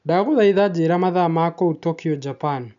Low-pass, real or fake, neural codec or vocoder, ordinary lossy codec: 7.2 kHz; real; none; none